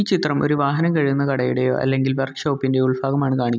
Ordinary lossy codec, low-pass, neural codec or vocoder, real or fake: none; none; none; real